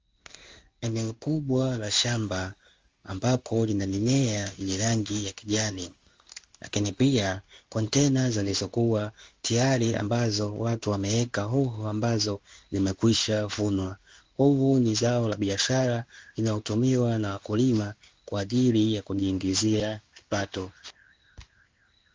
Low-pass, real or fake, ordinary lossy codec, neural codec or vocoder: 7.2 kHz; fake; Opus, 32 kbps; codec, 16 kHz in and 24 kHz out, 1 kbps, XY-Tokenizer